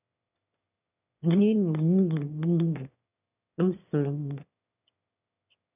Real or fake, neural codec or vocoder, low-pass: fake; autoencoder, 22.05 kHz, a latent of 192 numbers a frame, VITS, trained on one speaker; 3.6 kHz